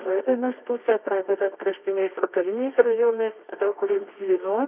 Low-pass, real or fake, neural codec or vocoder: 3.6 kHz; fake; codec, 24 kHz, 0.9 kbps, WavTokenizer, medium music audio release